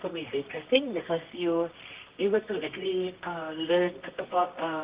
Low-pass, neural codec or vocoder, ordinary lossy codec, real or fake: 3.6 kHz; codec, 24 kHz, 0.9 kbps, WavTokenizer, medium music audio release; Opus, 32 kbps; fake